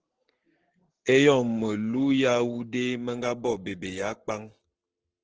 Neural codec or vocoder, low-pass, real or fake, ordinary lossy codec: codec, 44.1 kHz, 7.8 kbps, Pupu-Codec; 7.2 kHz; fake; Opus, 16 kbps